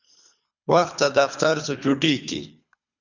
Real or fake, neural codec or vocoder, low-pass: fake; codec, 24 kHz, 3 kbps, HILCodec; 7.2 kHz